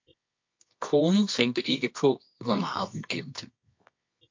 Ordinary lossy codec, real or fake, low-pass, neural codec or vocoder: MP3, 48 kbps; fake; 7.2 kHz; codec, 24 kHz, 0.9 kbps, WavTokenizer, medium music audio release